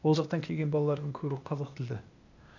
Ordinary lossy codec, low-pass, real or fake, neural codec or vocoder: none; 7.2 kHz; fake; codec, 16 kHz, 0.8 kbps, ZipCodec